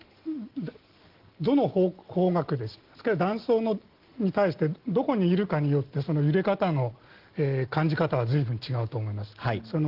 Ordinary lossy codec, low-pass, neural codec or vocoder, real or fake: Opus, 16 kbps; 5.4 kHz; none; real